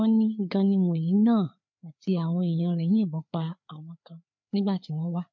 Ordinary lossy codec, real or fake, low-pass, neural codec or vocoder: MP3, 48 kbps; fake; 7.2 kHz; codec, 16 kHz, 4 kbps, FreqCodec, larger model